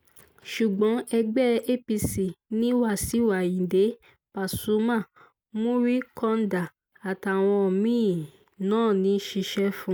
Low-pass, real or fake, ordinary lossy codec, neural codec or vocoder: none; real; none; none